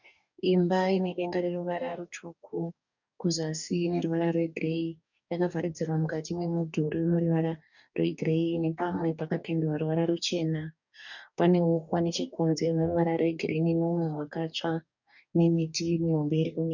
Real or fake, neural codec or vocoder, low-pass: fake; codec, 44.1 kHz, 2.6 kbps, DAC; 7.2 kHz